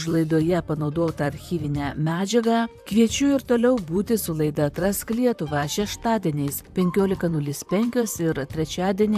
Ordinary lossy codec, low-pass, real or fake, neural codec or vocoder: AAC, 96 kbps; 14.4 kHz; fake; vocoder, 44.1 kHz, 128 mel bands, Pupu-Vocoder